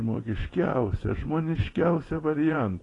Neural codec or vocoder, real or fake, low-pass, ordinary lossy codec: vocoder, 24 kHz, 100 mel bands, Vocos; fake; 10.8 kHz; AAC, 32 kbps